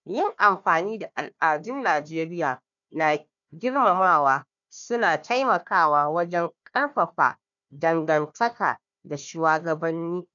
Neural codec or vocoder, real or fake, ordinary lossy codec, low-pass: codec, 16 kHz, 1 kbps, FunCodec, trained on Chinese and English, 50 frames a second; fake; none; 7.2 kHz